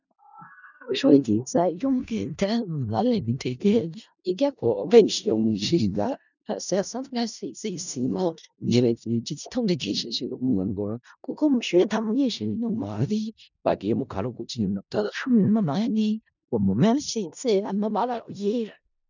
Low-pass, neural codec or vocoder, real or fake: 7.2 kHz; codec, 16 kHz in and 24 kHz out, 0.4 kbps, LongCat-Audio-Codec, four codebook decoder; fake